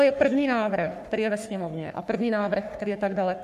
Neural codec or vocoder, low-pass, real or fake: codec, 44.1 kHz, 3.4 kbps, Pupu-Codec; 14.4 kHz; fake